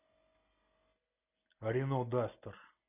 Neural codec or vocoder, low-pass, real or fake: none; 3.6 kHz; real